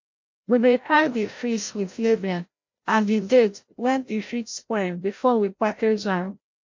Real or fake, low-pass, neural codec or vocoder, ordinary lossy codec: fake; 7.2 kHz; codec, 16 kHz, 0.5 kbps, FreqCodec, larger model; MP3, 48 kbps